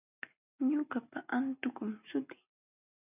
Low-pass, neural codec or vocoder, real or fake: 3.6 kHz; none; real